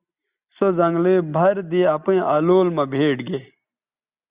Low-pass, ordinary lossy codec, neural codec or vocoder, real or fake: 3.6 kHz; Opus, 64 kbps; none; real